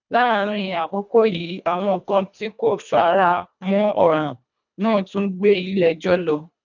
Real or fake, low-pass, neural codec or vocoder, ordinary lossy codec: fake; 7.2 kHz; codec, 24 kHz, 1.5 kbps, HILCodec; none